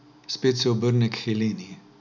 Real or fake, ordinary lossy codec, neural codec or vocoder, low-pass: real; none; none; 7.2 kHz